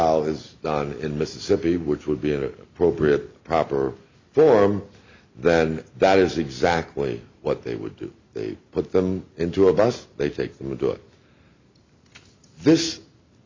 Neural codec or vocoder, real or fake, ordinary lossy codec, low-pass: none; real; MP3, 64 kbps; 7.2 kHz